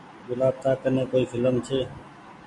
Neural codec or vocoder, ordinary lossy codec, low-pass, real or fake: none; MP3, 64 kbps; 10.8 kHz; real